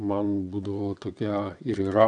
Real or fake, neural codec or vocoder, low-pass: fake; vocoder, 22.05 kHz, 80 mel bands, WaveNeXt; 9.9 kHz